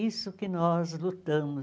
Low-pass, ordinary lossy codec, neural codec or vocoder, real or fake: none; none; none; real